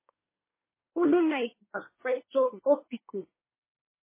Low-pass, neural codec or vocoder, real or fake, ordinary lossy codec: 3.6 kHz; codec, 24 kHz, 1 kbps, SNAC; fake; MP3, 16 kbps